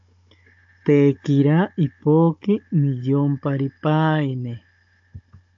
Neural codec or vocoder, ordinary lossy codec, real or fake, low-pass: codec, 16 kHz, 16 kbps, FunCodec, trained on Chinese and English, 50 frames a second; AAC, 64 kbps; fake; 7.2 kHz